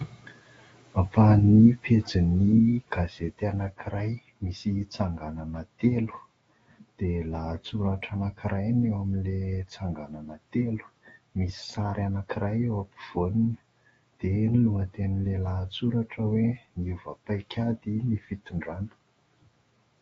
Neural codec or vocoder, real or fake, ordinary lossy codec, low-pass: autoencoder, 48 kHz, 128 numbers a frame, DAC-VAE, trained on Japanese speech; fake; AAC, 24 kbps; 19.8 kHz